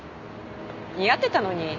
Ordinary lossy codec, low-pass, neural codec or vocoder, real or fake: none; 7.2 kHz; none; real